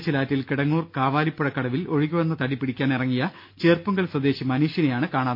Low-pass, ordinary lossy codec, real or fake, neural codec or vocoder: 5.4 kHz; MP3, 32 kbps; real; none